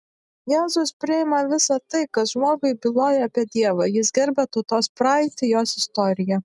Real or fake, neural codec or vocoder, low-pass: real; none; 10.8 kHz